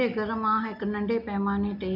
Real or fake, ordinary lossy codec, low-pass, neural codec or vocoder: real; none; 5.4 kHz; none